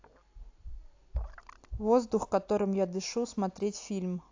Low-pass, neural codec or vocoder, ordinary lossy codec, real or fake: 7.2 kHz; none; AAC, 48 kbps; real